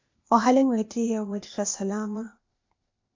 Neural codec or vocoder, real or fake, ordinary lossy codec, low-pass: codec, 16 kHz, 0.8 kbps, ZipCodec; fake; MP3, 64 kbps; 7.2 kHz